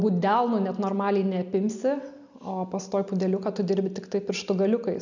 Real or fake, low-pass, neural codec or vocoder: real; 7.2 kHz; none